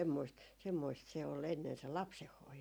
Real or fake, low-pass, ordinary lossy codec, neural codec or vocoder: real; none; none; none